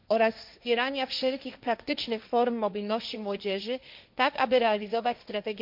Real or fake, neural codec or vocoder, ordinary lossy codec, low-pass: fake; codec, 16 kHz, 1.1 kbps, Voila-Tokenizer; MP3, 48 kbps; 5.4 kHz